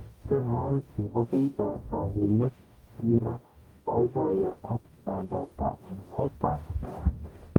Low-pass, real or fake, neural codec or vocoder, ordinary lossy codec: 19.8 kHz; fake; codec, 44.1 kHz, 0.9 kbps, DAC; Opus, 32 kbps